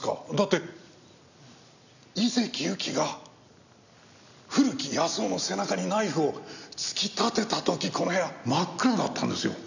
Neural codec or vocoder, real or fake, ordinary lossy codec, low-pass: none; real; none; 7.2 kHz